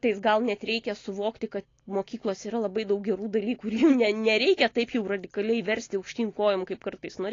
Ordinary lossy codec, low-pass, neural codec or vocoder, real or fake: AAC, 32 kbps; 7.2 kHz; none; real